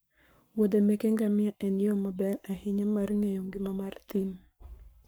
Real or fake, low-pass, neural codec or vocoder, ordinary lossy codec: fake; none; codec, 44.1 kHz, 7.8 kbps, Pupu-Codec; none